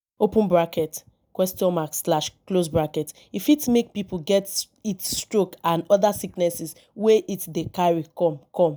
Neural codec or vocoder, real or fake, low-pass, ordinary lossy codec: none; real; none; none